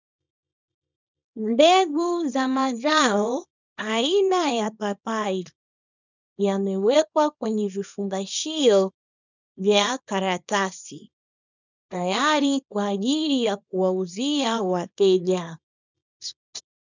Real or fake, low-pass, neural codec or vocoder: fake; 7.2 kHz; codec, 24 kHz, 0.9 kbps, WavTokenizer, small release